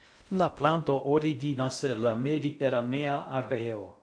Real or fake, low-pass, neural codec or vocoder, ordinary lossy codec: fake; 9.9 kHz; codec, 16 kHz in and 24 kHz out, 0.6 kbps, FocalCodec, streaming, 2048 codes; MP3, 64 kbps